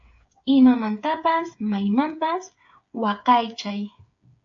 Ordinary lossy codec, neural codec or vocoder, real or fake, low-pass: AAC, 64 kbps; codec, 16 kHz, 8 kbps, FreqCodec, smaller model; fake; 7.2 kHz